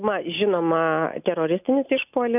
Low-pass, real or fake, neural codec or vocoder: 3.6 kHz; real; none